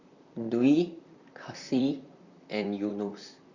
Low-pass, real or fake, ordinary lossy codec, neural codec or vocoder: 7.2 kHz; fake; Opus, 64 kbps; vocoder, 44.1 kHz, 128 mel bands, Pupu-Vocoder